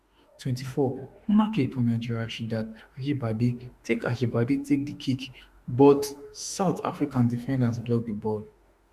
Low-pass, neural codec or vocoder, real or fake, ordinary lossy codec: 14.4 kHz; autoencoder, 48 kHz, 32 numbers a frame, DAC-VAE, trained on Japanese speech; fake; Opus, 64 kbps